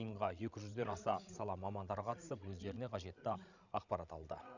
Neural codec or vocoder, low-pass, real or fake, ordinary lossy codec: codec, 16 kHz, 16 kbps, FreqCodec, larger model; 7.2 kHz; fake; none